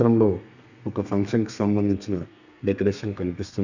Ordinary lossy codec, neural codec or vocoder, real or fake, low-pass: none; codec, 32 kHz, 1.9 kbps, SNAC; fake; 7.2 kHz